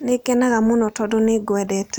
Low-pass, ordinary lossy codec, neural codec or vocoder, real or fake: none; none; none; real